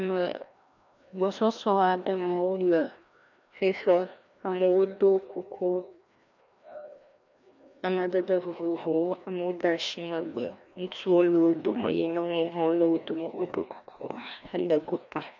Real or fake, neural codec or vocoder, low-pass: fake; codec, 16 kHz, 1 kbps, FreqCodec, larger model; 7.2 kHz